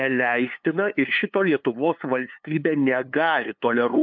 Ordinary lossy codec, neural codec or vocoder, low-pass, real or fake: MP3, 64 kbps; codec, 16 kHz, 2 kbps, FunCodec, trained on LibriTTS, 25 frames a second; 7.2 kHz; fake